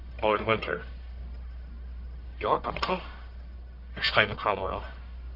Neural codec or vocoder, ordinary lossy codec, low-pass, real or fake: codec, 44.1 kHz, 1.7 kbps, Pupu-Codec; AAC, 48 kbps; 5.4 kHz; fake